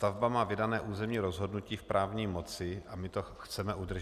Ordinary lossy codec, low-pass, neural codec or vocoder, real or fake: Opus, 64 kbps; 14.4 kHz; none; real